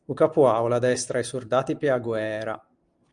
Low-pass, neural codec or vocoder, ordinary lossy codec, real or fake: 9.9 kHz; none; Opus, 32 kbps; real